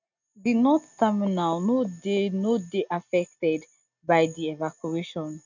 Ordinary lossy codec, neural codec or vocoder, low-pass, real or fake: none; none; 7.2 kHz; real